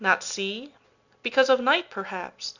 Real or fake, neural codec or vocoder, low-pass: real; none; 7.2 kHz